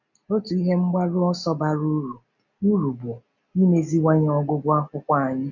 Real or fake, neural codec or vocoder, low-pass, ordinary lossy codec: real; none; 7.2 kHz; none